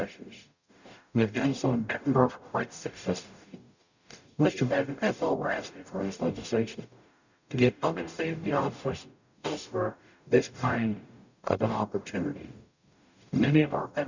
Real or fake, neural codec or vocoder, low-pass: fake; codec, 44.1 kHz, 0.9 kbps, DAC; 7.2 kHz